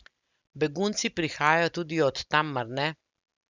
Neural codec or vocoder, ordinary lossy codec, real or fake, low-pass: none; Opus, 64 kbps; real; 7.2 kHz